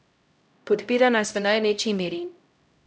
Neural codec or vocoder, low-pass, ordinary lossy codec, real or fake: codec, 16 kHz, 0.5 kbps, X-Codec, HuBERT features, trained on LibriSpeech; none; none; fake